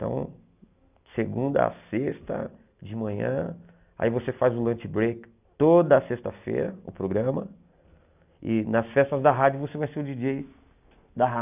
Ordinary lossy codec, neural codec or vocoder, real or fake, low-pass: none; none; real; 3.6 kHz